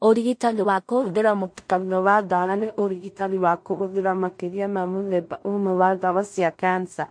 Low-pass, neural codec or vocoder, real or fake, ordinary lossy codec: 9.9 kHz; codec, 16 kHz in and 24 kHz out, 0.4 kbps, LongCat-Audio-Codec, two codebook decoder; fake; MP3, 48 kbps